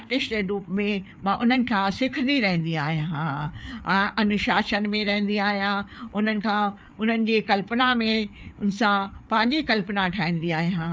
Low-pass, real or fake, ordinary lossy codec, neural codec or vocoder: none; fake; none; codec, 16 kHz, 4 kbps, FreqCodec, larger model